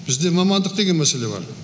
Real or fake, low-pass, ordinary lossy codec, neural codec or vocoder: real; none; none; none